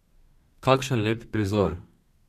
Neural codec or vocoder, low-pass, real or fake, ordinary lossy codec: codec, 32 kHz, 1.9 kbps, SNAC; 14.4 kHz; fake; none